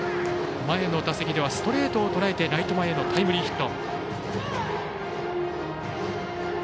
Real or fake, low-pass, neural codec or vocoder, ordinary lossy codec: real; none; none; none